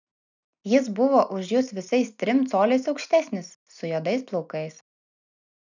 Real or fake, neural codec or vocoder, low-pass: real; none; 7.2 kHz